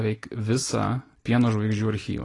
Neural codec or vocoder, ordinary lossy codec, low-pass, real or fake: none; AAC, 32 kbps; 10.8 kHz; real